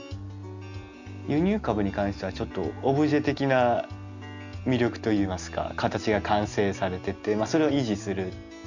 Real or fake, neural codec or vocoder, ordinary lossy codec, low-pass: real; none; none; 7.2 kHz